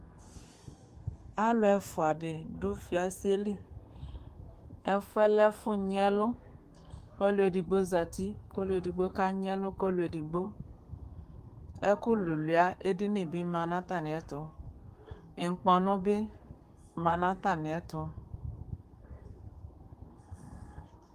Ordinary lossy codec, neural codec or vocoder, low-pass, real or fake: Opus, 32 kbps; codec, 32 kHz, 1.9 kbps, SNAC; 14.4 kHz; fake